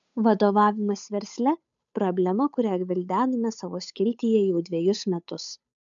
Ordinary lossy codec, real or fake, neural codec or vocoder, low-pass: MP3, 96 kbps; fake; codec, 16 kHz, 8 kbps, FunCodec, trained on Chinese and English, 25 frames a second; 7.2 kHz